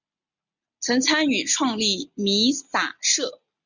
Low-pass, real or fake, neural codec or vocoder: 7.2 kHz; real; none